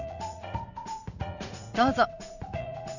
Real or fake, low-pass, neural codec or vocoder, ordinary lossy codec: fake; 7.2 kHz; vocoder, 44.1 kHz, 80 mel bands, Vocos; none